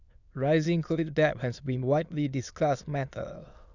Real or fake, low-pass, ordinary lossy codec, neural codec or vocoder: fake; 7.2 kHz; none; autoencoder, 22.05 kHz, a latent of 192 numbers a frame, VITS, trained on many speakers